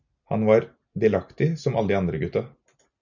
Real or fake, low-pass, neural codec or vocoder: real; 7.2 kHz; none